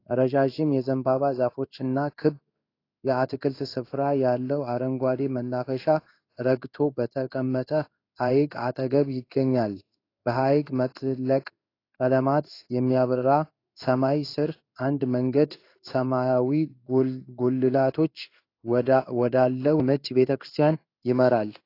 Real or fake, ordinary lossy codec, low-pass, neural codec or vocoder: fake; AAC, 32 kbps; 5.4 kHz; codec, 16 kHz in and 24 kHz out, 1 kbps, XY-Tokenizer